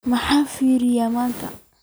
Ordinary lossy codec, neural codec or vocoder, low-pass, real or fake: none; none; none; real